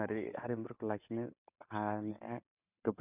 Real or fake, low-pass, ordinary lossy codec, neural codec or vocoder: real; 3.6 kHz; none; none